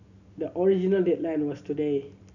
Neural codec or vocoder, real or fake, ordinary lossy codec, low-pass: none; real; none; 7.2 kHz